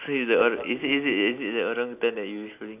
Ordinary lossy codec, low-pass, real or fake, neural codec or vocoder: none; 3.6 kHz; real; none